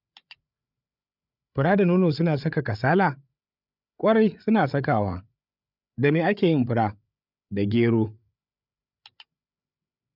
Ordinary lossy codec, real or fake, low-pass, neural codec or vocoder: none; fake; 5.4 kHz; codec, 16 kHz, 8 kbps, FreqCodec, larger model